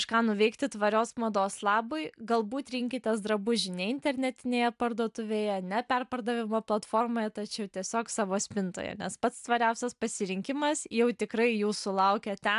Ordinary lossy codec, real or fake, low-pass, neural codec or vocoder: AAC, 96 kbps; real; 10.8 kHz; none